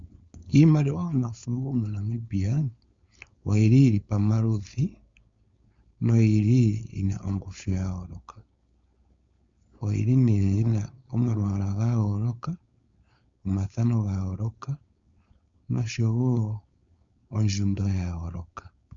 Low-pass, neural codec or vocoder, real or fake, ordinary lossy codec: 7.2 kHz; codec, 16 kHz, 4.8 kbps, FACodec; fake; Opus, 64 kbps